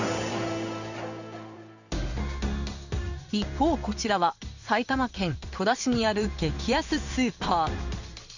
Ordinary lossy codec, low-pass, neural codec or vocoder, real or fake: none; 7.2 kHz; codec, 16 kHz in and 24 kHz out, 1 kbps, XY-Tokenizer; fake